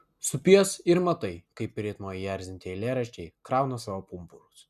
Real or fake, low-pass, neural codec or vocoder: real; 14.4 kHz; none